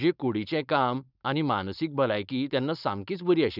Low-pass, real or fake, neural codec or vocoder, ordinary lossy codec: 5.4 kHz; fake; codec, 16 kHz, 4.8 kbps, FACodec; none